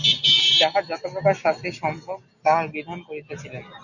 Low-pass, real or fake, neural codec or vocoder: 7.2 kHz; real; none